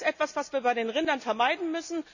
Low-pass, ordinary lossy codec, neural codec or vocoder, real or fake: 7.2 kHz; none; none; real